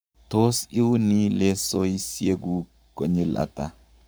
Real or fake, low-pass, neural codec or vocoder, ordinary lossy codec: fake; none; codec, 44.1 kHz, 7.8 kbps, Pupu-Codec; none